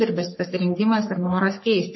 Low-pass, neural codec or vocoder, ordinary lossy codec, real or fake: 7.2 kHz; codec, 44.1 kHz, 3.4 kbps, Pupu-Codec; MP3, 24 kbps; fake